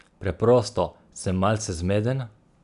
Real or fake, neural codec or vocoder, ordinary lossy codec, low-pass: real; none; none; 10.8 kHz